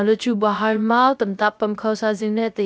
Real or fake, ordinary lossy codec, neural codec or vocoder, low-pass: fake; none; codec, 16 kHz, 0.3 kbps, FocalCodec; none